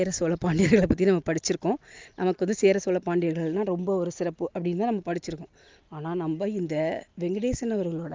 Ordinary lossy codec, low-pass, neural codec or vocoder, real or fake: Opus, 32 kbps; 7.2 kHz; none; real